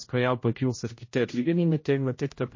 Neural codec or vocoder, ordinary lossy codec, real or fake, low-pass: codec, 16 kHz, 0.5 kbps, X-Codec, HuBERT features, trained on general audio; MP3, 32 kbps; fake; 7.2 kHz